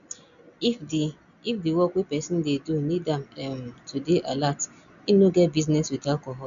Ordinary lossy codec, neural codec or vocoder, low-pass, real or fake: MP3, 96 kbps; none; 7.2 kHz; real